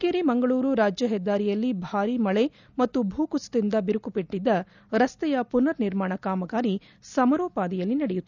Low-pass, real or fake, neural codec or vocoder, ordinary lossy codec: 7.2 kHz; real; none; none